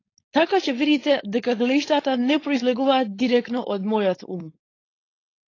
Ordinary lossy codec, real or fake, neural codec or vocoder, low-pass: AAC, 32 kbps; fake; codec, 16 kHz, 4.8 kbps, FACodec; 7.2 kHz